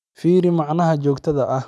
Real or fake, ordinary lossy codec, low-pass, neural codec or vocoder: real; none; none; none